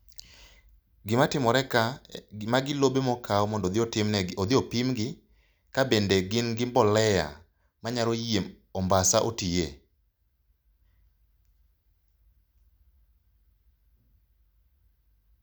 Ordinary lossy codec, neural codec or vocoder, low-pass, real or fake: none; none; none; real